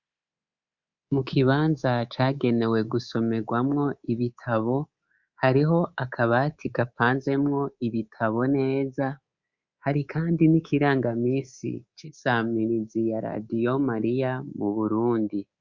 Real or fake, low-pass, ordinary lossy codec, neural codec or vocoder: fake; 7.2 kHz; Opus, 64 kbps; codec, 24 kHz, 3.1 kbps, DualCodec